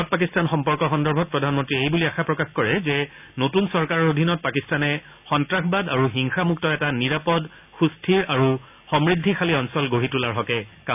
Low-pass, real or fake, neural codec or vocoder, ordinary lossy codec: 3.6 kHz; real; none; none